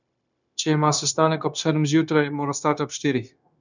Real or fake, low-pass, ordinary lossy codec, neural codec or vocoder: fake; 7.2 kHz; none; codec, 16 kHz, 0.9 kbps, LongCat-Audio-Codec